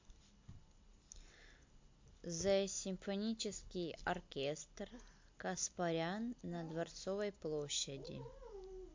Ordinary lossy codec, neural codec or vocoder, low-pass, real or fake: MP3, 48 kbps; none; 7.2 kHz; real